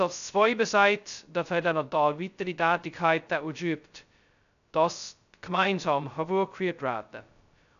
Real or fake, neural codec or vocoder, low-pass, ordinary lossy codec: fake; codec, 16 kHz, 0.2 kbps, FocalCodec; 7.2 kHz; none